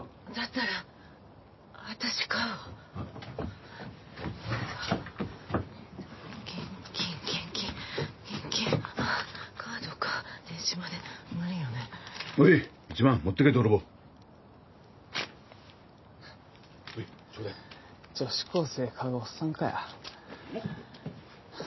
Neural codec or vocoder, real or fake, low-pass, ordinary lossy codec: none; real; 7.2 kHz; MP3, 24 kbps